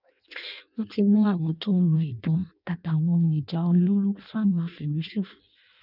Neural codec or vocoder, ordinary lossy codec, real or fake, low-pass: codec, 16 kHz in and 24 kHz out, 0.6 kbps, FireRedTTS-2 codec; none; fake; 5.4 kHz